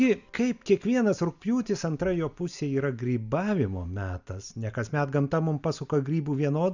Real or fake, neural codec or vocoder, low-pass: real; none; 7.2 kHz